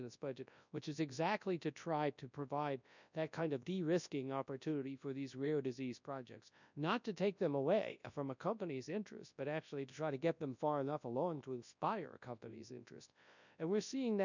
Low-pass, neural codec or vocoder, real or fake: 7.2 kHz; codec, 24 kHz, 0.9 kbps, WavTokenizer, large speech release; fake